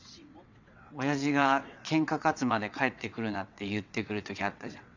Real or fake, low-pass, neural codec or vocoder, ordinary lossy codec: fake; 7.2 kHz; vocoder, 22.05 kHz, 80 mel bands, WaveNeXt; none